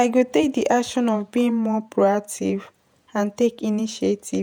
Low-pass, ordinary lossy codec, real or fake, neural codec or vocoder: none; none; fake; vocoder, 48 kHz, 128 mel bands, Vocos